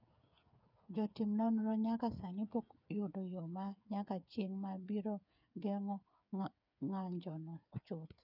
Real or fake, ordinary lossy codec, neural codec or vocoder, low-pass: fake; none; codec, 16 kHz, 8 kbps, FreqCodec, smaller model; 5.4 kHz